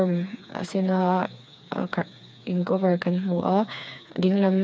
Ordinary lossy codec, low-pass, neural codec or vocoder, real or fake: none; none; codec, 16 kHz, 4 kbps, FreqCodec, smaller model; fake